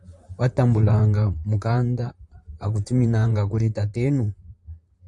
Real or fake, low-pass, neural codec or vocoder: fake; 10.8 kHz; vocoder, 44.1 kHz, 128 mel bands, Pupu-Vocoder